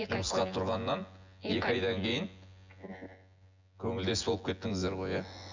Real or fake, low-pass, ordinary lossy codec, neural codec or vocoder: fake; 7.2 kHz; MP3, 64 kbps; vocoder, 24 kHz, 100 mel bands, Vocos